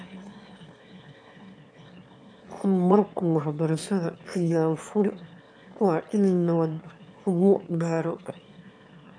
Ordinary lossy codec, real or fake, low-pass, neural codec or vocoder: none; fake; 9.9 kHz; autoencoder, 22.05 kHz, a latent of 192 numbers a frame, VITS, trained on one speaker